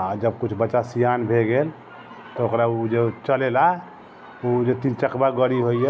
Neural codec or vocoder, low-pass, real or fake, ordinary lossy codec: none; none; real; none